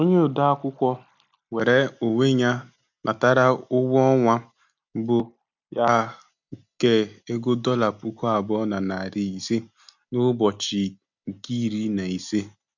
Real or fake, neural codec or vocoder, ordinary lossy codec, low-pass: real; none; none; 7.2 kHz